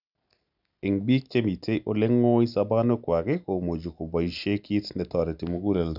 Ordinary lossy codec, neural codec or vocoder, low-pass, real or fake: none; none; 5.4 kHz; real